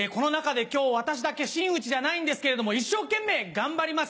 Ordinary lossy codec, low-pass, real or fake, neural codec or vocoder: none; none; real; none